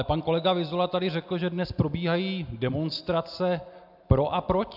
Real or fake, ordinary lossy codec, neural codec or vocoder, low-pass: real; AAC, 48 kbps; none; 5.4 kHz